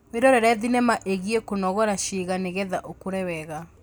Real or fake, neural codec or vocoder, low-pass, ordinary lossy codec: real; none; none; none